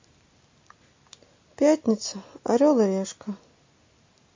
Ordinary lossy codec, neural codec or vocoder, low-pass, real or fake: MP3, 32 kbps; none; 7.2 kHz; real